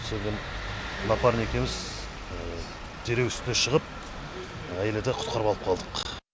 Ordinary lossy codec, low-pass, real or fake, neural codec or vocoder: none; none; real; none